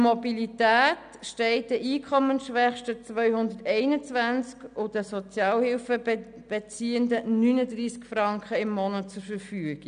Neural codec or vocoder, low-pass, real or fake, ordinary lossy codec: none; 9.9 kHz; real; none